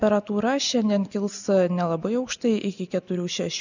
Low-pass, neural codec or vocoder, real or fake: 7.2 kHz; none; real